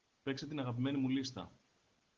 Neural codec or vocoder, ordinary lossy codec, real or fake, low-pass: none; Opus, 16 kbps; real; 7.2 kHz